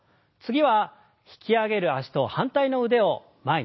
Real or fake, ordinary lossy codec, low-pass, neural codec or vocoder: real; MP3, 24 kbps; 7.2 kHz; none